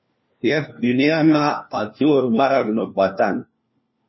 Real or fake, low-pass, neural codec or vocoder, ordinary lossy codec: fake; 7.2 kHz; codec, 16 kHz, 1 kbps, FunCodec, trained on LibriTTS, 50 frames a second; MP3, 24 kbps